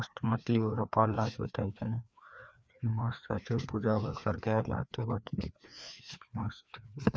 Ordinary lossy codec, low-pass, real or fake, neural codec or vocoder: none; none; fake; codec, 16 kHz, 2 kbps, FreqCodec, larger model